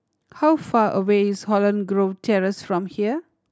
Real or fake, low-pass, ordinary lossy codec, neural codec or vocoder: real; none; none; none